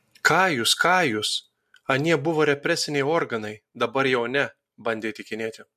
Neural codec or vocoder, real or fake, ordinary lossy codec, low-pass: none; real; MP3, 64 kbps; 14.4 kHz